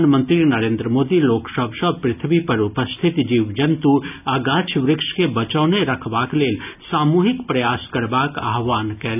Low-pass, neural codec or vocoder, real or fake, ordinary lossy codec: 3.6 kHz; none; real; none